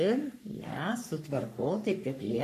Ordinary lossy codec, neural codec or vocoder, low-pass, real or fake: MP3, 96 kbps; codec, 44.1 kHz, 3.4 kbps, Pupu-Codec; 14.4 kHz; fake